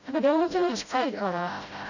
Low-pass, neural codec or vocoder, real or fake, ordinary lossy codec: 7.2 kHz; codec, 16 kHz, 0.5 kbps, FreqCodec, smaller model; fake; none